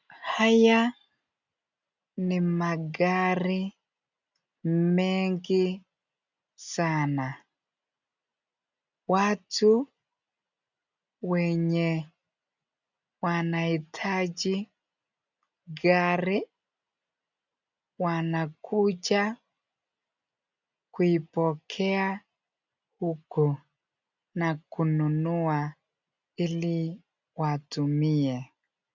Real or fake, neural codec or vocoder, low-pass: real; none; 7.2 kHz